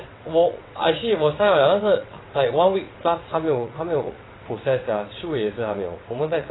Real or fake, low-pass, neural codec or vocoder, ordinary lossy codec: fake; 7.2 kHz; vocoder, 22.05 kHz, 80 mel bands, WaveNeXt; AAC, 16 kbps